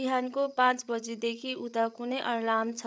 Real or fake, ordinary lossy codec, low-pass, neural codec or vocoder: fake; none; none; codec, 16 kHz, 8 kbps, FreqCodec, larger model